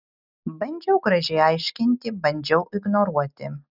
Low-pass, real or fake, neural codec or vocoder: 5.4 kHz; real; none